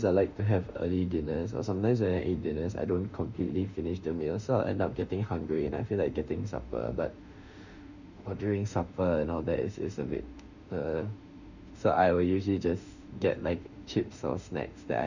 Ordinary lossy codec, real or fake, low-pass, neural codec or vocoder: none; fake; 7.2 kHz; autoencoder, 48 kHz, 32 numbers a frame, DAC-VAE, trained on Japanese speech